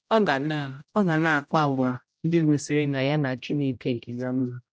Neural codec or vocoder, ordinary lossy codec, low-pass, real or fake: codec, 16 kHz, 0.5 kbps, X-Codec, HuBERT features, trained on general audio; none; none; fake